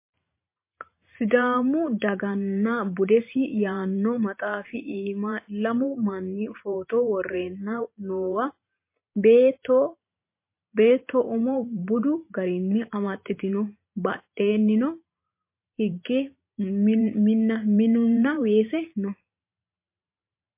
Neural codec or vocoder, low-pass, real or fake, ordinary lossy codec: vocoder, 44.1 kHz, 128 mel bands every 512 samples, BigVGAN v2; 3.6 kHz; fake; MP3, 24 kbps